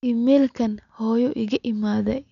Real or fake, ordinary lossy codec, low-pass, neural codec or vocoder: real; none; 7.2 kHz; none